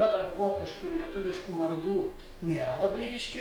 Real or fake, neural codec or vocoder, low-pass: fake; codec, 44.1 kHz, 2.6 kbps, DAC; 19.8 kHz